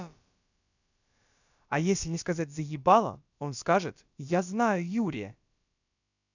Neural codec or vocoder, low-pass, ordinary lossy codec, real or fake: codec, 16 kHz, about 1 kbps, DyCAST, with the encoder's durations; 7.2 kHz; none; fake